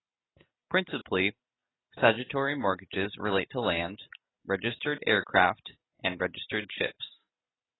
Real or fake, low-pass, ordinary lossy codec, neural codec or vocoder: real; 7.2 kHz; AAC, 16 kbps; none